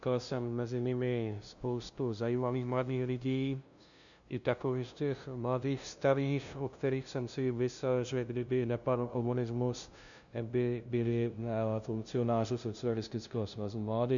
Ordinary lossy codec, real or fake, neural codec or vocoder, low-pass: MP3, 96 kbps; fake; codec, 16 kHz, 0.5 kbps, FunCodec, trained on LibriTTS, 25 frames a second; 7.2 kHz